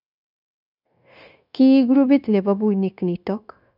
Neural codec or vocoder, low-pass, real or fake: codec, 16 kHz, 0.9 kbps, LongCat-Audio-Codec; 5.4 kHz; fake